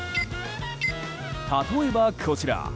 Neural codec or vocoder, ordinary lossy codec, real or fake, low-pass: none; none; real; none